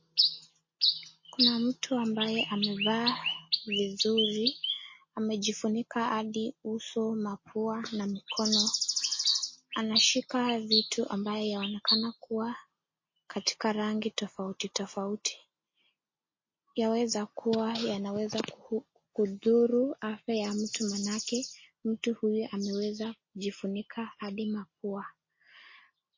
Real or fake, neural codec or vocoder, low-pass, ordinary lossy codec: real; none; 7.2 kHz; MP3, 32 kbps